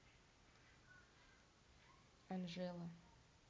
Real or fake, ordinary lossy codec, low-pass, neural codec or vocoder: real; none; none; none